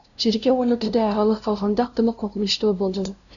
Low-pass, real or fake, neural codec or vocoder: 7.2 kHz; fake; codec, 16 kHz, 0.5 kbps, FunCodec, trained on LibriTTS, 25 frames a second